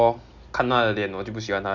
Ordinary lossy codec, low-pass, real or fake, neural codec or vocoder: none; 7.2 kHz; real; none